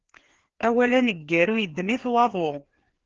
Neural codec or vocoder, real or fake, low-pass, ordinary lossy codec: codec, 16 kHz, 2 kbps, FreqCodec, larger model; fake; 7.2 kHz; Opus, 16 kbps